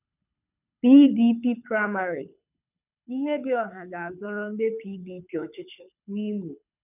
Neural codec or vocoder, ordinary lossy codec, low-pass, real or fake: codec, 24 kHz, 6 kbps, HILCodec; none; 3.6 kHz; fake